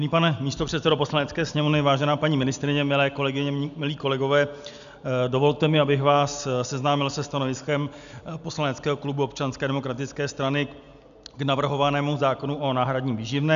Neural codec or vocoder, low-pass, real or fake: none; 7.2 kHz; real